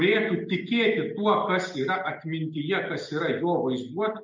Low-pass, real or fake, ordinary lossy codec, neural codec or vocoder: 7.2 kHz; real; MP3, 64 kbps; none